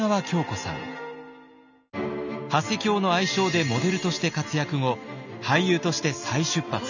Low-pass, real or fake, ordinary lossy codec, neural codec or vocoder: 7.2 kHz; real; none; none